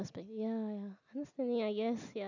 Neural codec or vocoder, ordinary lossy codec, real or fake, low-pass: none; none; real; 7.2 kHz